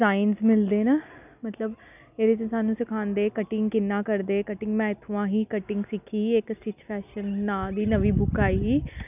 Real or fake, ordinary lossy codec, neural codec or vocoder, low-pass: real; none; none; 3.6 kHz